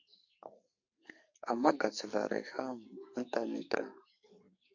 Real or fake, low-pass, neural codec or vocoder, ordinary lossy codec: fake; 7.2 kHz; codec, 44.1 kHz, 2.6 kbps, SNAC; MP3, 48 kbps